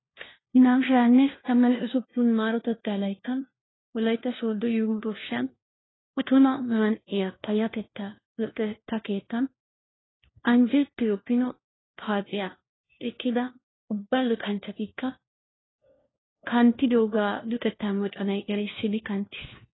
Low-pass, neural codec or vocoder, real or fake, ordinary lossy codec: 7.2 kHz; codec, 16 kHz, 1 kbps, FunCodec, trained on LibriTTS, 50 frames a second; fake; AAC, 16 kbps